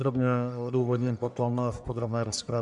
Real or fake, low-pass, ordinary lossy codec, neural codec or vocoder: fake; 10.8 kHz; MP3, 96 kbps; codec, 44.1 kHz, 1.7 kbps, Pupu-Codec